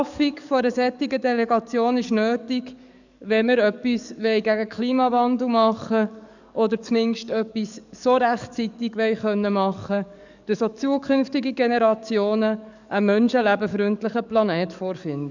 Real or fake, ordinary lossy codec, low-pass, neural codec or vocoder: fake; none; 7.2 kHz; codec, 44.1 kHz, 7.8 kbps, DAC